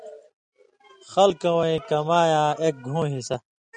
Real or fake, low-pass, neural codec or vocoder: real; 9.9 kHz; none